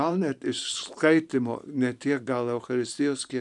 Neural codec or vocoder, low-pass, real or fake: vocoder, 44.1 kHz, 128 mel bands every 512 samples, BigVGAN v2; 10.8 kHz; fake